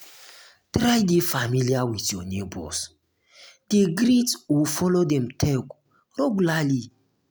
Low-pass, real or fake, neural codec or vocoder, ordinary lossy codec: none; real; none; none